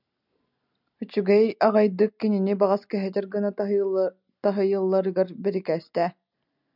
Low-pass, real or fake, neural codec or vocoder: 5.4 kHz; real; none